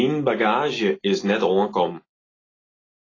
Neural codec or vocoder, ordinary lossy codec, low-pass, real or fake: none; AAC, 32 kbps; 7.2 kHz; real